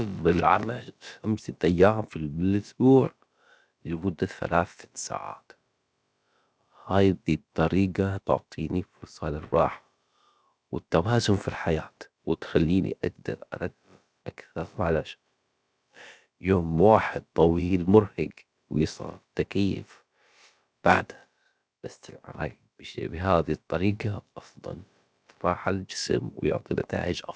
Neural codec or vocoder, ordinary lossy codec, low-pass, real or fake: codec, 16 kHz, about 1 kbps, DyCAST, with the encoder's durations; none; none; fake